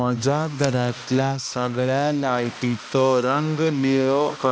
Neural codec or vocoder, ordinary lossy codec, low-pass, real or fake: codec, 16 kHz, 1 kbps, X-Codec, HuBERT features, trained on balanced general audio; none; none; fake